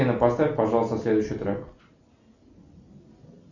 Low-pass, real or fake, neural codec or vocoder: 7.2 kHz; real; none